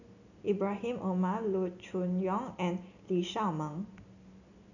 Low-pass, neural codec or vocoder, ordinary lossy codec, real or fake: 7.2 kHz; none; none; real